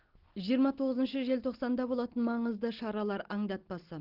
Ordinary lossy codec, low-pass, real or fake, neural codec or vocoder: Opus, 16 kbps; 5.4 kHz; real; none